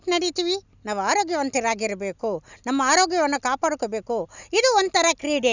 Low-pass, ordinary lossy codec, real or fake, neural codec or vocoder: 7.2 kHz; none; real; none